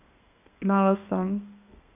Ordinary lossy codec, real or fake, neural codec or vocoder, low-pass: AAC, 32 kbps; fake; codec, 32 kHz, 1.9 kbps, SNAC; 3.6 kHz